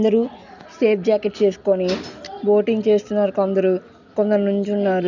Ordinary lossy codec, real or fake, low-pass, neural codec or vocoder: none; real; 7.2 kHz; none